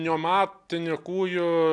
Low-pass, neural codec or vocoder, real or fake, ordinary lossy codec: 10.8 kHz; none; real; AAC, 64 kbps